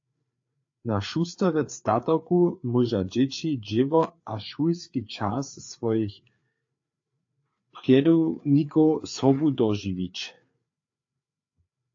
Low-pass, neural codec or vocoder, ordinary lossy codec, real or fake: 7.2 kHz; codec, 16 kHz, 4 kbps, FreqCodec, larger model; AAC, 48 kbps; fake